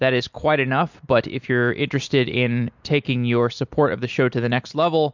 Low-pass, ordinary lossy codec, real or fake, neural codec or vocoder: 7.2 kHz; MP3, 64 kbps; real; none